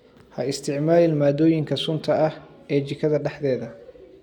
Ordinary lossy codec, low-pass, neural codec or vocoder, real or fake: none; 19.8 kHz; none; real